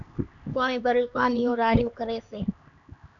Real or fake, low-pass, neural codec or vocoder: fake; 7.2 kHz; codec, 16 kHz, 2 kbps, X-Codec, HuBERT features, trained on LibriSpeech